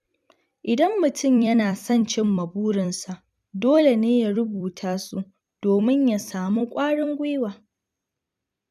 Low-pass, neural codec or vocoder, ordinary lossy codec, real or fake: 14.4 kHz; vocoder, 44.1 kHz, 128 mel bands every 256 samples, BigVGAN v2; none; fake